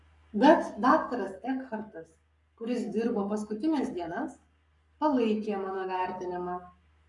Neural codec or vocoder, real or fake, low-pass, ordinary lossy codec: codec, 44.1 kHz, 7.8 kbps, Pupu-Codec; fake; 10.8 kHz; MP3, 96 kbps